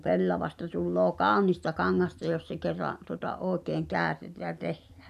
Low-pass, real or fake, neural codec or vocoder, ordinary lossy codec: 14.4 kHz; real; none; none